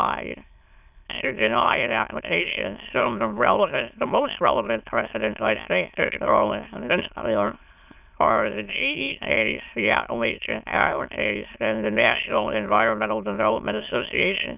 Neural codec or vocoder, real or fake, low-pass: autoencoder, 22.05 kHz, a latent of 192 numbers a frame, VITS, trained on many speakers; fake; 3.6 kHz